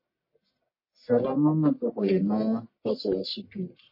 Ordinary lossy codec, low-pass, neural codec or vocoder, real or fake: MP3, 24 kbps; 5.4 kHz; codec, 44.1 kHz, 1.7 kbps, Pupu-Codec; fake